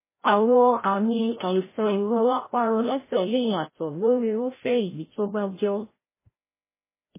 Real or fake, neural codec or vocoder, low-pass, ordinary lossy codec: fake; codec, 16 kHz, 0.5 kbps, FreqCodec, larger model; 3.6 kHz; MP3, 16 kbps